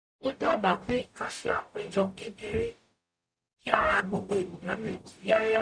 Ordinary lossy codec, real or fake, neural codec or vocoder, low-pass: none; fake; codec, 44.1 kHz, 0.9 kbps, DAC; 9.9 kHz